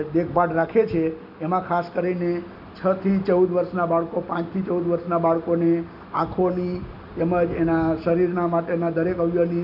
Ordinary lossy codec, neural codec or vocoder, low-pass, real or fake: none; none; 5.4 kHz; real